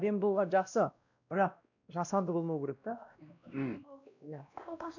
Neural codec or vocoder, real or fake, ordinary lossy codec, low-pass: codec, 16 kHz, 1 kbps, X-Codec, WavLM features, trained on Multilingual LibriSpeech; fake; none; 7.2 kHz